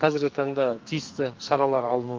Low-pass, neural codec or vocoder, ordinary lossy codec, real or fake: 7.2 kHz; codec, 44.1 kHz, 2.6 kbps, SNAC; Opus, 24 kbps; fake